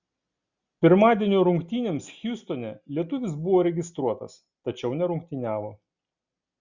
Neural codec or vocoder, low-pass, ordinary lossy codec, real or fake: none; 7.2 kHz; Opus, 64 kbps; real